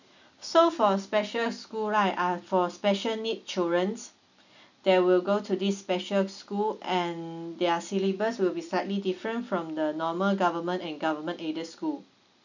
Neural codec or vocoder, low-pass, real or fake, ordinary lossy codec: none; 7.2 kHz; real; none